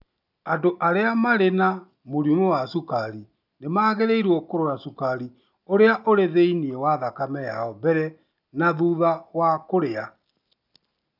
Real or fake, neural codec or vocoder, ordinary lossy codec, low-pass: real; none; none; 5.4 kHz